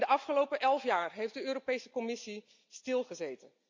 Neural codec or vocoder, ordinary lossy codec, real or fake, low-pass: none; MP3, 64 kbps; real; 7.2 kHz